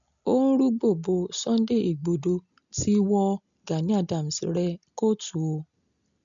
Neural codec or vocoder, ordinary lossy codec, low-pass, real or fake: none; none; 7.2 kHz; real